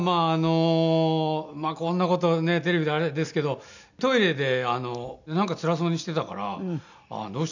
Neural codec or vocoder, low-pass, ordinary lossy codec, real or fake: none; 7.2 kHz; none; real